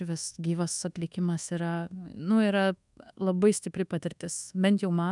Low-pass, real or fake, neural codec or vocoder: 10.8 kHz; fake; codec, 24 kHz, 1.2 kbps, DualCodec